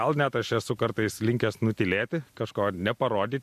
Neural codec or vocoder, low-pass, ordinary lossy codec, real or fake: none; 14.4 kHz; MP3, 64 kbps; real